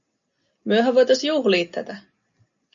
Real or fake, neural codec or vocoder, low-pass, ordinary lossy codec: real; none; 7.2 kHz; MP3, 64 kbps